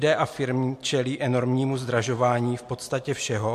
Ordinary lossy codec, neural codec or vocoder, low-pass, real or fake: MP3, 64 kbps; none; 14.4 kHz; real